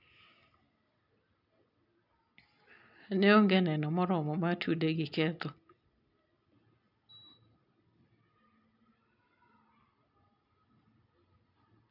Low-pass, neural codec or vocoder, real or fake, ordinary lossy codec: 5.4 kHz; none; real; none